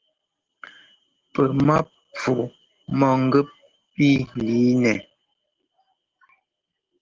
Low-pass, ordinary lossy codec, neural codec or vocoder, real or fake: 7.2 kHz; Opus, 16 kbps; none; real